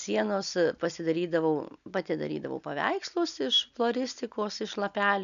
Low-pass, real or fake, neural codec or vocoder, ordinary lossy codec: 7.2 kHz; real; none; MP3, 96 kbps